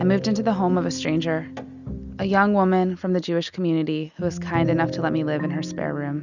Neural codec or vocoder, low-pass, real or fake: none; 7.2 kHz; real